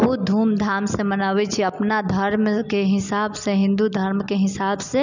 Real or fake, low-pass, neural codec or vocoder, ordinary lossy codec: real; 7.2 kHz; none; none